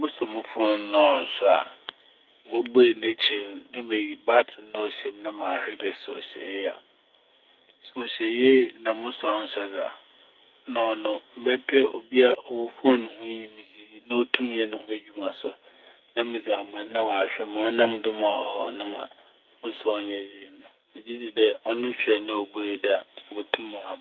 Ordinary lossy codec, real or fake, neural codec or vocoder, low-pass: Opus, 24 kbps; fake; codec, 44.1 kHz, 2.6 kbps, SNAC; 7.2 kHz